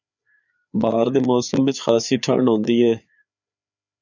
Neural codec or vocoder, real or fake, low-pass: codec, 16 kHz, 4 kbps, FreqCodec, larger model; fake; 7.2 kHz